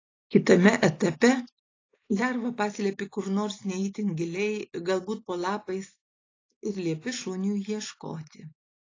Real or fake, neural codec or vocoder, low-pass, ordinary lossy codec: real; none; 7.2 kHz; AAC, 32 kbps